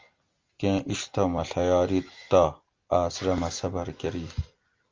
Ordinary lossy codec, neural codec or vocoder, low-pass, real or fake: Opus, 32 kbps; none; 7.2 kHz; real